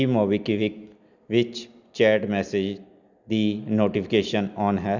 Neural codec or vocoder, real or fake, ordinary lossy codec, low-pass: none; real; none; 7.2 kHz